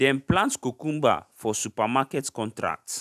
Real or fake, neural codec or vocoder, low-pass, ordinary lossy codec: real; none; 14.4 kHz; none